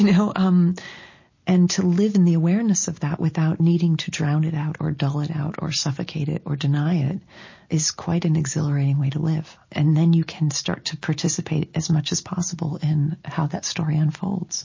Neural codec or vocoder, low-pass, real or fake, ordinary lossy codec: none; 7.2 kHz; real; MP3, 32 kbps